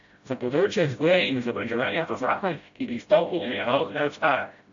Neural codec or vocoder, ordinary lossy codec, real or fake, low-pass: codec, 16 kHz, 0.5 kbps, FreqCodec, smaller model; AAC, 64 kbps; fake; 7.2 kHz